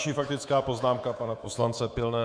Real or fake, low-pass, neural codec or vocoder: fake; 9.9 kHz; codec, 24 kHz, 3.1 kbps, DualCodec